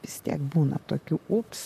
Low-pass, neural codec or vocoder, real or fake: 14.4 kHz; vocoder, 44.1 kHz, 128 mel bands, Pupu-Vocoder; fake